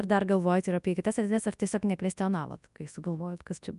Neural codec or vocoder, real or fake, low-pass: codec, 24 kHz, 0.9 kbps, WavTokenizer, large speech release; fake; 10.8 kHz